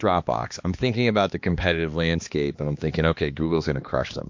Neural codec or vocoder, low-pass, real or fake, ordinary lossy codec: codec, 16 kHz, 2 kbps, X-Codec, HuBERT features, trained on balanced general audio; 7.2 kHz; fake; MP3, 48 kbps